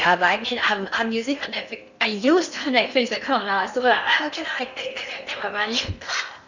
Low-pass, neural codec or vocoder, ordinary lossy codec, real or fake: 7.2 kHz; codec, 16 kHz in and 24 kHz out, 0.8 kbps, FocalCodec, streaming, 65536 codes; none; fake